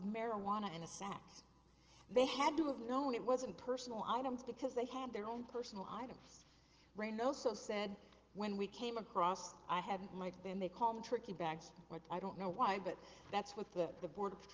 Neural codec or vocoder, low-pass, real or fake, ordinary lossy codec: none; 7.2 kHz; real; Opus, 16 kbps